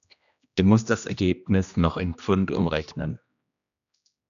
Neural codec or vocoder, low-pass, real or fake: codec, 16 kHz, 1 kbps, X-Codec, HuBERT features, trained on general audio; 7.2 kHz; fake